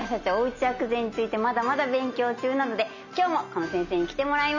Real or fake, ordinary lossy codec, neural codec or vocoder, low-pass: real; none; none; 7.2 kHz